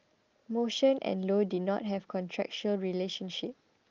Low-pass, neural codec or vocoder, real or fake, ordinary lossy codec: 7.2 kHz; none; real; Opus, 32 kbps